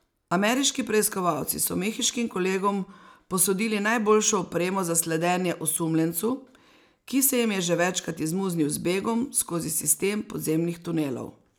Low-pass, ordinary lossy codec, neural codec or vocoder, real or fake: none; none; none; real